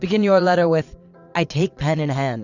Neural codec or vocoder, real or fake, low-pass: vocoder, 22.05 kHz, 80 mel bands, Vocos; fake; 7.2 kHz